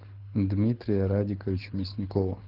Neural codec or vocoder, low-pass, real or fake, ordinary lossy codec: codec, 24 kHz, 6 kbps, HILCodec; 5.4 kHz; fake; Opus, 16 kbps